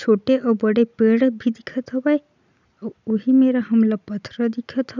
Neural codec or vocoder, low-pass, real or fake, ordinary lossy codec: none; 7.2 kHz; real; none